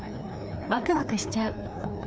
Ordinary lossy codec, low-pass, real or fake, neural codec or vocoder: none; none; fake; codec, 16 kHz, 2 kbps, FreqCodec, larger model